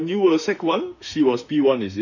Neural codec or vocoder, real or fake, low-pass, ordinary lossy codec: autoencoder, 48 kHz, 32 numbers a frame, DAC-VAE, trained on Japanese speech; fake; 7.2 kHz; none